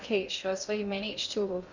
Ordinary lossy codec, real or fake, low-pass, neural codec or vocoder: none; fake; 7.2 kHz; codec, 16 kHz in and 24 kHz out, 0.6 kbps, FocalCodec, streaming, 2048 codes